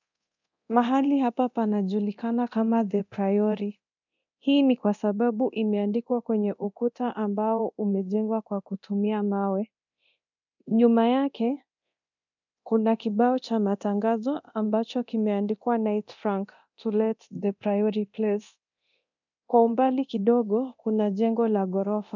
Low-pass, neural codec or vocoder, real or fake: 7.2 kHz; codec, 24 kHz, 0.9 kbps, DualCodec; fake